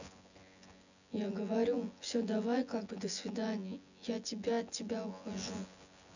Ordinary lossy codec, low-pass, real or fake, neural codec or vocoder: none; 7.2 kHz; fake; vocoder, 24 kHz, 100 mel bands, Vocos